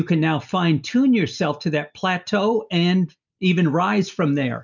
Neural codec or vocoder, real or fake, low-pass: none; real; 7.2 kHz